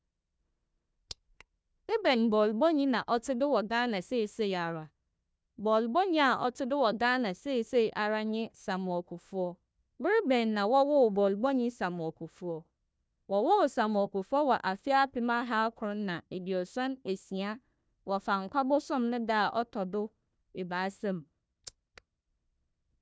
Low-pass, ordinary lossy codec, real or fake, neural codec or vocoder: none; none; fake; codec, 16 kHz, 1 kbps, FunCodec, trained on Chinese and English, 50 frames a second